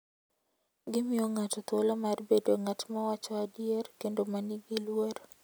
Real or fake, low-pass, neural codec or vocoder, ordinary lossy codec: real; none; none; none